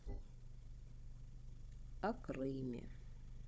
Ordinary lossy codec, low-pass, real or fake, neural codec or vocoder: none; none; fake; codec, 16 kHz, 16 kbps, FunCodec, trained on Chinese and English, 50 frames a second